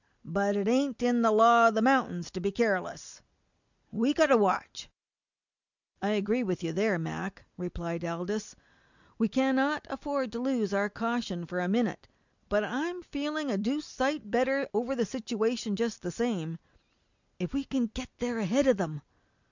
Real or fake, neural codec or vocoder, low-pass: real; none; 7.2 kHz